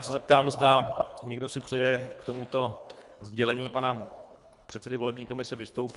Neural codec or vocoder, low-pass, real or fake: codec, 24 kHz, 1.5 kbps, HILCodec; 10.8 kHz; fake